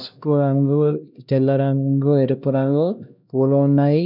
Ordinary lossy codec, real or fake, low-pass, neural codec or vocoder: none; fake; 5.4 kHz; codec, 16 kHz, 1 kbps, X-Codec, HuBERT features, trained on LibriSpeech